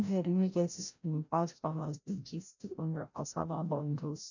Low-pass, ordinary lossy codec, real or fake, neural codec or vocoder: 7.2 kHz; none; fake; codec, 16 kHz, 0.5 kbps, FreqCodec, larger model